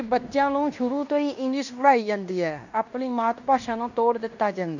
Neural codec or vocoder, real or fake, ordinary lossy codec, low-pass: codec, 16 kHz in and 24 kHz out, 0.9 kbps, LongCat-Audio-Codec, fine tuned four codebook decoder; fake; none; 7.2 kHz